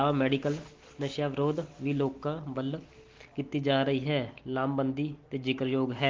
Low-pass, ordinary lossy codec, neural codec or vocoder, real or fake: 7.2 kHz; Opus, 16 kbps; none; real